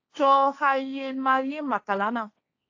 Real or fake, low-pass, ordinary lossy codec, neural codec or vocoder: fake; 7.2 kHz; AAC, 48 kbps; codec, 16 kHz, 1.1 kbps, Voila-Tokenizer